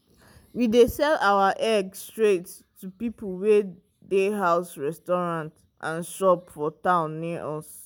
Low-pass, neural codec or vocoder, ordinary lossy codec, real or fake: none; none; none; real